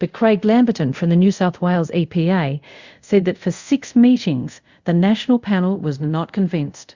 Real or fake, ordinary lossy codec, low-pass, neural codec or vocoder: fake; Opus, 64 kbps; 7.2 kHz; codec, 24 kHz, 0.5 kbps, DualCodec